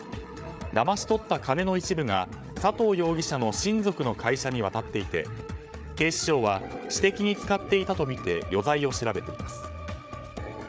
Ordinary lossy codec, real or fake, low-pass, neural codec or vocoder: none; fake; none; codec, 16 kHz, 8 kbps, FreqCodec, larger model